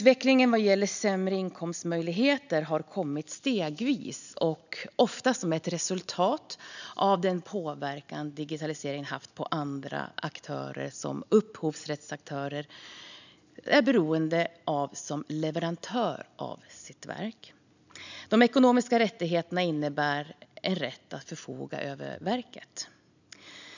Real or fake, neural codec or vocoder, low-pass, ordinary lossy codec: real; none; 7.2 kHz; none